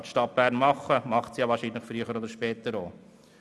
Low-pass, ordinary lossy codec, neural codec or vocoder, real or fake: none; none; none; real